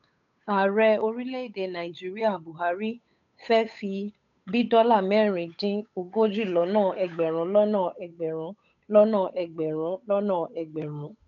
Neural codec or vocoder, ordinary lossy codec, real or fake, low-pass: codec, 16 kHz, 8 kbps, FunCodec, trained on Chinese and English, 25 frames a second; none; fake; 7.2 kHz